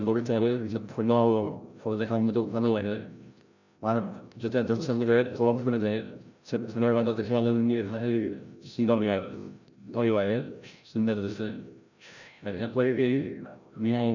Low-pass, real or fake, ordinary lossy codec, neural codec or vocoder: 7.2 kHz; fake; none; codec, 16 kHz, 0.5 kbps, FreqCodec, larger model